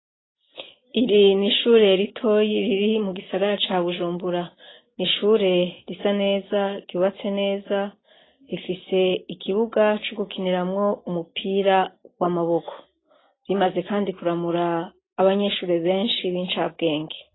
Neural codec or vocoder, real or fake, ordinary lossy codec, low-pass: none; real; AAC, 16 kbps; 7.2 kHz